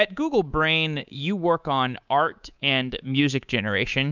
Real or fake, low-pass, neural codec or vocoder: fake; 7.2 kHz; codec, 24 kHz, 3.1 kbps, DualCodec